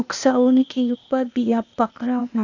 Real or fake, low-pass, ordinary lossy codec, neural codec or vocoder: fake; 7.2 kHz; none; codec, 16 kHz, 0.8 kbps, ZipCodec